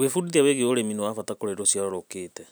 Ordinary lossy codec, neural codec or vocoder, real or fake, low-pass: none; none; real; none